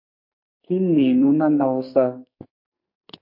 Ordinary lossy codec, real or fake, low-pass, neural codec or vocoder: MP3, 32 kbps; fake; 5.4 kHz; codec, 44.1 kHz, 2.6 kbps, SNAC